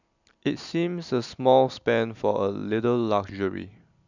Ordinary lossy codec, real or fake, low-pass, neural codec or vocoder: none; real; 7.2 kHz; none